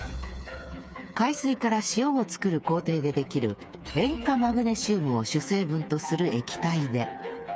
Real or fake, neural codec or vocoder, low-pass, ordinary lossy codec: fake; codec, 16 kHz, 4 kbps, FreqCodec, smaller model; none; none